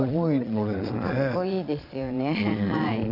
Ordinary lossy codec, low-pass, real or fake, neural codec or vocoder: none; 5.4 kHz; fake; vocoder, 22.05 kHz, 80 mel bands, Vocos